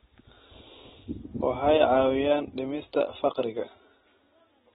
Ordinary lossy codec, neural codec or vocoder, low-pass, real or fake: AAC, 16 kbps; none; 7.2 kHz; real